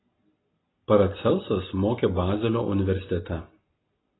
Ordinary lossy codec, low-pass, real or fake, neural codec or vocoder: AAC, 16 kbps; 7.2 kHz; real; none